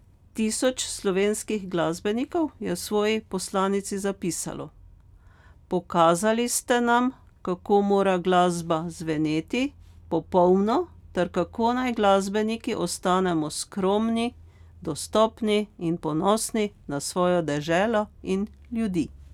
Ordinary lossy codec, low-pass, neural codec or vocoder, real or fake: none; 19.8 kHz; none; real